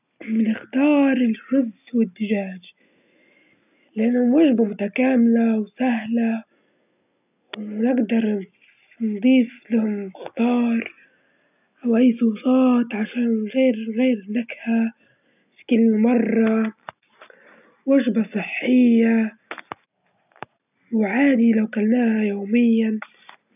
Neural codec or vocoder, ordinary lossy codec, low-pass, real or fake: none; none; 3.6 kHz; real